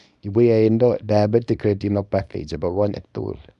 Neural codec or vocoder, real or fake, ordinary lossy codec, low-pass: codec, 24 kHz, 0.9 kbps, WavTokenizer, small release; fake; none; 10.8 kHz